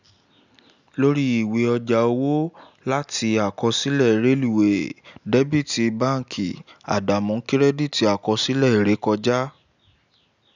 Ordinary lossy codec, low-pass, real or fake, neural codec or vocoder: none; 7.2 kHz; real; none